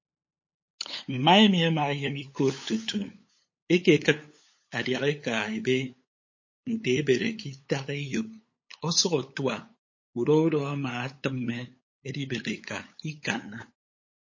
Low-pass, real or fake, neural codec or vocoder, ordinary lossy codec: 7.2 kHz; fake; codec, 16 kHz, 8 kbps, FunCodec, trained on LibriTTS, 25 frames a second; MP3, 32 kbps